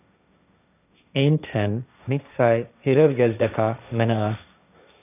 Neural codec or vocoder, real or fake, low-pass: codec, 16 kHz, 1.1 kbps, Voila-Tokenizer; fake; 3.6 kHz